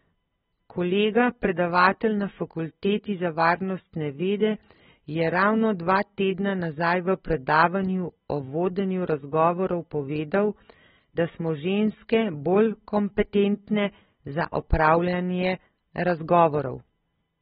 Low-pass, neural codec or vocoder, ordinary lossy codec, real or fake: 19.8 kHz; none; AAC, 16 kbps; real